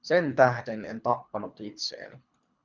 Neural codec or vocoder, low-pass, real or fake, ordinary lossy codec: codec, 24 kHz, 3 kbps, HILCodec; 7.2 kHz; fake; Opus, 64 kbps